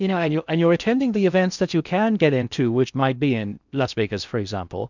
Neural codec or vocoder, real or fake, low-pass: codec, 16 kHz in and 24 kHz out, 0.6 kbps, FocalCodec, streaming, 2048 codes; fake; 7.2 kHz